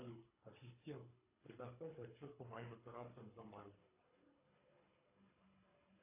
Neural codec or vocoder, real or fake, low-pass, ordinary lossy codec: codec, 24 kHz, 3 kbps, HILCodec; fake; 3.6 kHz; AAC, 32 kbps